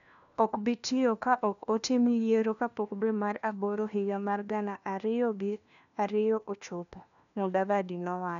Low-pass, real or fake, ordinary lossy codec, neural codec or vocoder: 7.2 kHz; fake; none; codec, 16 kHz, 1 kbps, FunCodec, trained on LibriTTS, 50 frames a second